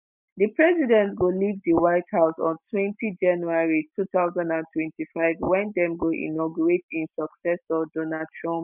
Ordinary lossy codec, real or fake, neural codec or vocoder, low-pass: none; real; none; 3.6 kHz